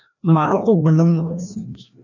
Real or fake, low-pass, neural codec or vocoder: fake; 7.2 kHz; codec, 16 kHz, 1 kbps, FreqCodec, larger model